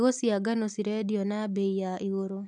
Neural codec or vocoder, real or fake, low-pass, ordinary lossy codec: none; real; 10.8 kHz; none